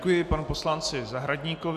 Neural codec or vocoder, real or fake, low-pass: none; real; 14.4 kHz